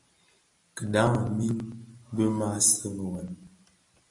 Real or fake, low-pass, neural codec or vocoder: real; 10.8 kHz; none